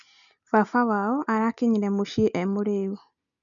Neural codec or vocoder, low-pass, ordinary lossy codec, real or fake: none; 7.2 kHz; none; real